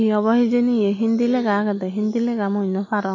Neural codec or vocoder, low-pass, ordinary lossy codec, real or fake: none; 7.2 kHz; MP3, 32 kbps; real